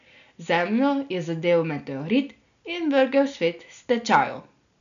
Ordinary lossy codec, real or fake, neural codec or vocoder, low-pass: none; real; none; 7.2 kHz